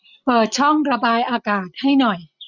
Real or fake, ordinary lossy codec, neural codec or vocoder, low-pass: real; none; none; 7.2 kHz